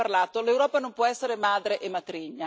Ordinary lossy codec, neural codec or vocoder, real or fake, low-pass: none; none; real; none